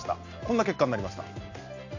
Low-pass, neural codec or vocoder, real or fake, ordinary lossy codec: 7.2 kHz; none; real; AAC, 48 kbps